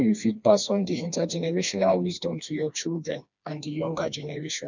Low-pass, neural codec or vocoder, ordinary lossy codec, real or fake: 7.2 kHz; codec, 16 kHz, 2 kbps, FreqCodec, smaller model; none; fake